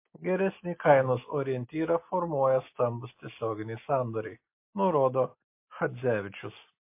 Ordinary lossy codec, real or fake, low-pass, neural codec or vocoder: MP3, 24 kbps; real; 3.6 kHz; none